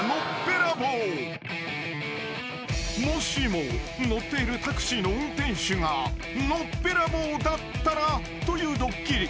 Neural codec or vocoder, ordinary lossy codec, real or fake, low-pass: none; none; real; none